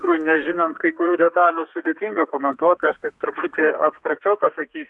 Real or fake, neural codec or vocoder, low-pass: fake; codec, 32 kHz, 1.9 kbps, SNAC; 10.8 kHz